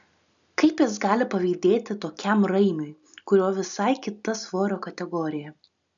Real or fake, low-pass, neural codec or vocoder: real; 7.2 kHz; none